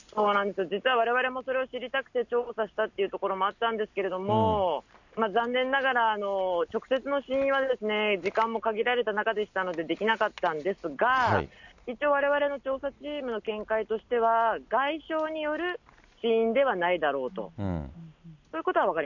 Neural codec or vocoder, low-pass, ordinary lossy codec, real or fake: none; 7.2 kHz; none; real